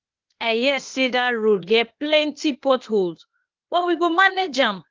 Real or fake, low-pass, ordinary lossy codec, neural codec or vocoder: fake; 7.2 kHz; Opus, 32 kbps; codec, 16 kHz, 0.8 kbps, ZipCodec